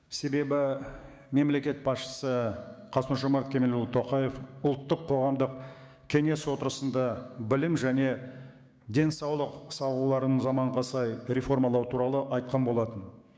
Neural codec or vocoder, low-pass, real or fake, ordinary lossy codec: codec, 16 kHz, 6 kbps, DAC; none; fake; none